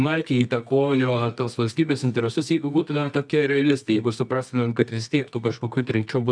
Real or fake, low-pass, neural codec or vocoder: fake; 9.9 kHz; codec, 24 kHz, 0.9 kbps, WavTokenizer, medium music audio release